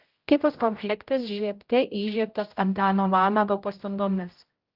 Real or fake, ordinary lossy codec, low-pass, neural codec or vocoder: fake; Opus, 32 kbps; 5.4 kHz; codec, 16 kHz, 0.5 kbps, X-Codec, HuBERT features, trained on general audio